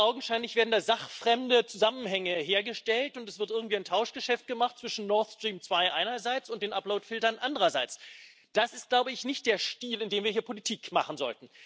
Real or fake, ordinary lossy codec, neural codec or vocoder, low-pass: real; none; none; none